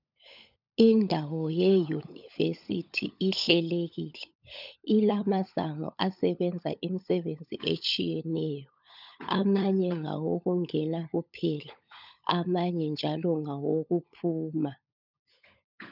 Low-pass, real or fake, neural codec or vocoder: 5.4 kHz; fake; codec, 16 kHz, 16 kbps, FunCodec, trained on LibriTTS, 50 frames a second